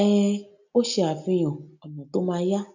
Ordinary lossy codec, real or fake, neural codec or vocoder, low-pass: none; real; none; 7.2 kHz